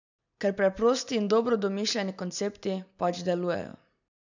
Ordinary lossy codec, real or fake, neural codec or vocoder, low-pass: none; real; none; 7.2 kHz